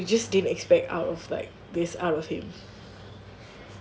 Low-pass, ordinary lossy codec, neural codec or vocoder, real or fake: none; none; none; real